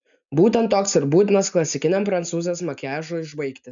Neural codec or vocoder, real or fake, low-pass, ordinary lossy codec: none; real; 7.2 kHz; MP3, 96 kbps